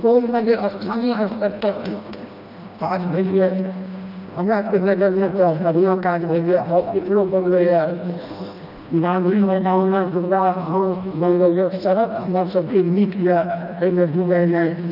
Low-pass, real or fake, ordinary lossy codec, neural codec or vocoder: 5.4 kHz; fake; none; codec, 16 kHz, 1 kbps, FreqCodec, smaller model